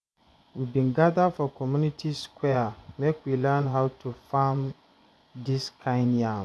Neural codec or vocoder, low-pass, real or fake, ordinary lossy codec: vocoder, 24 kHz, 100 mel bands, Vocos; none; fake; none